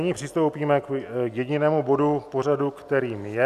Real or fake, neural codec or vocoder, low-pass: real; none; 14.4 kHz